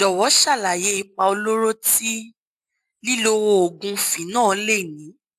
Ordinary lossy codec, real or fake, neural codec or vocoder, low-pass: AAC, 96 kbps; real; none; 14.4 kHz